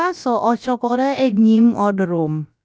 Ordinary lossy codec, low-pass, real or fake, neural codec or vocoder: none; none; fake; codec, 16 kHz, about 1 kbps, DyCAST, with the encoder's durations